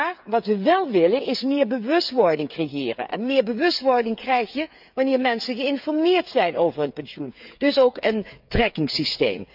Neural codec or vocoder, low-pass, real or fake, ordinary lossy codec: codec, 16 kHz, 8 kbps, FreqCodec, smaller model; 5.4 kHz; fake; none